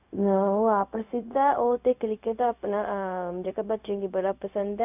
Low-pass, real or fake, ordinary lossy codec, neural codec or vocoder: 3.6 kHz; fake; none; codec, 16 kHz, 0.4 kbps, LongCat-Audio-Codec